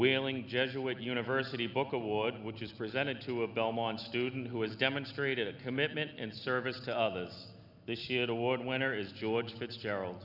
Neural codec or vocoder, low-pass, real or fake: none; 5.4 kHz; real